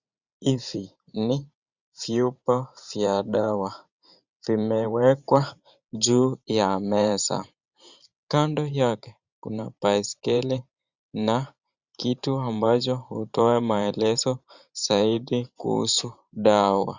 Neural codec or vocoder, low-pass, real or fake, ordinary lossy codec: vocoder, 44.1 kHz, 128 mel bands every 512 samples, BigVGAN v2; 7.2 kHz; fake; Opus, 64 kbps